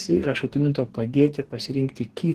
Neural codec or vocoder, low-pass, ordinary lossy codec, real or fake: codec, 44.1 kHz, 2.6 kbps, DAC; 14.4 kHz; Opus, 16 kbps; fake